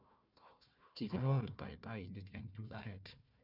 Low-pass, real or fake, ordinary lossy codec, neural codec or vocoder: 5.4 kHz; fake; none; codec, 16 kHz, 1 kbps, FunCodec, trained on Chinese and English, 50 frames a second